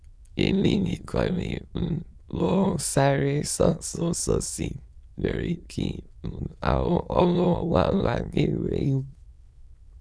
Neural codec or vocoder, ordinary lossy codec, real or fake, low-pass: autoencoder, 22.05 kHz, a latent of 192 numbers a frame, VITS, trained on many speakers; none; fake; none